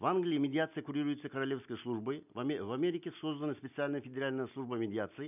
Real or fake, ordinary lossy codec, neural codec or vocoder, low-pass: real; none; none; 3.6 kHz